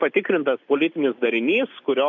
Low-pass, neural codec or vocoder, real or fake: 7.2 kHz; none; real